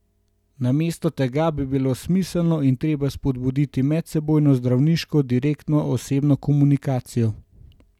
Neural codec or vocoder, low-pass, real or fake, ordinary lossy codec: none; 19.8 kHz; real; none